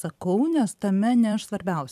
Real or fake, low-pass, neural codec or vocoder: fake; 14.4 kHz; vocoder, 44.1 kHz, 128 mel bands every 256 samples, BigVGAN v2